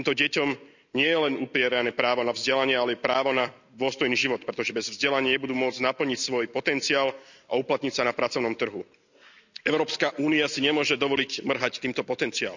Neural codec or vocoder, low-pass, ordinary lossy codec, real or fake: none; 7.2 kHz; none; real